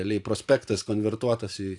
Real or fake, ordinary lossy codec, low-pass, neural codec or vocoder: fake; AAC, 64 kbps; 10.8 kHz; vocoder, 44.1 kHz, 128 mel bands every 512 samples, BigVGAN v2